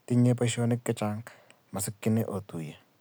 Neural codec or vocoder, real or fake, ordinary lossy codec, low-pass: none; real; none; none